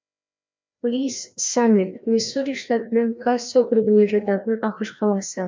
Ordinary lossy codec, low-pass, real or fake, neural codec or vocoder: MP3, 64 kbps; 7.2 kHz; fake; codec, 16 kHz, 1 kbps, FreqCodec, larger model